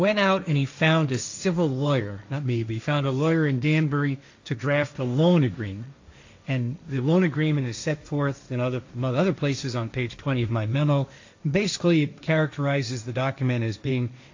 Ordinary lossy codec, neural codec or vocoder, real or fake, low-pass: AAC, 48 kbps; codec, 16 kHz, 1.1 kbps, Voila-Tokenizer; fake; 7.2 kHz